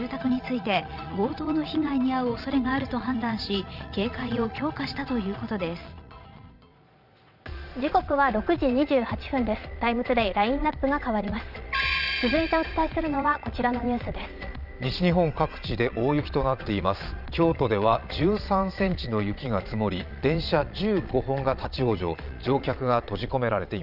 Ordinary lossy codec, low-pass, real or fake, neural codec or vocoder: none; 5.4 kHz; fake; vocoder, 22.05 kHz, 80 mel bands, Vocos